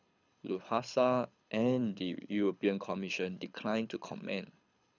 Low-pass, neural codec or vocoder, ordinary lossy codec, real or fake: 7.2 kHz; codec, 24 kHz, 6 kbps, HILCodec; none; fake